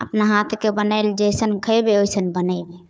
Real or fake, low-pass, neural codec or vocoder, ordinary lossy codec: fake; none; codec, 16 kHz, 4 kbps, FunCodec, trained on Chinese and English, 50 frames a second; none